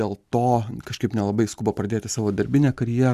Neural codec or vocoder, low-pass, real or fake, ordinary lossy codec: none; 14.4 kHz; real; AAC, 96 kbps